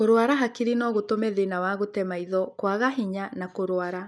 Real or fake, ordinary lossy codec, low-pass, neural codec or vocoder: real; none; none; none